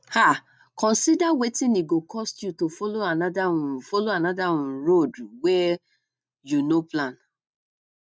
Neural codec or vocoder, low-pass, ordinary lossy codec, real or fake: none; none; none; real